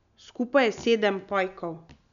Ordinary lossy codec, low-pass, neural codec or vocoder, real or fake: none; 7.2 kHz; none; real